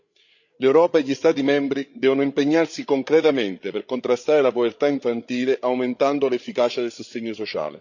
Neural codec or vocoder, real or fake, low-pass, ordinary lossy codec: codec, 16 kHz, 8 kbps, FreqCodec, larger model; fake; 7.2 kHz; none